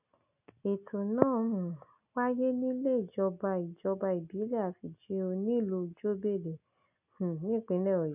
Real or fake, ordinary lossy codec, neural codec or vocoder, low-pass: real; none; none; 3.6 kHz